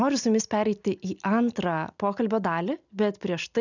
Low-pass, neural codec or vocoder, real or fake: 7.2 kHz; none; real